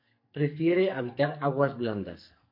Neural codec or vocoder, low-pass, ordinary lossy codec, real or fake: codec, 44.1 kHz, 2.6 kbps, SNAC; 5.4 kHz; MP3, 32 kbps; fake